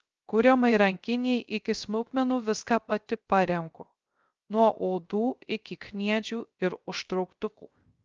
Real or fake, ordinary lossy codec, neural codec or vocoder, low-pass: fake; Opus, 24 kbps; codec, 16 kHz, 0.3 kbps, FocalCodec; 7.2 kHz